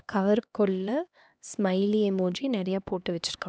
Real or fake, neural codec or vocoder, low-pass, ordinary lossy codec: fake; codec, 16 kHz, 2 kbps, X-Codec, HuBERT features, trained on LibriSpeech; none; none